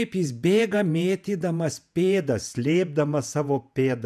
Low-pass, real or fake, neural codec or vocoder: 14.4 kHz; fake; vocoder, 48 kHz, 128 mel bands, Vocos